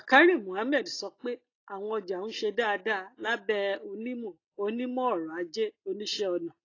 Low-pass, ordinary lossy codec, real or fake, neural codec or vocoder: 7.2 kHz; AAC, 32 kbps; real; none